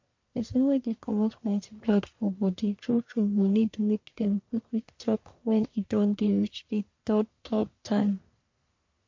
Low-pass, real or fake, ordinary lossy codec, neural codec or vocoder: 7.2 kHz; fake; MP3, 48 kbps; codec, 44.1 kHz, 1.7 kbps, Pupu-Codec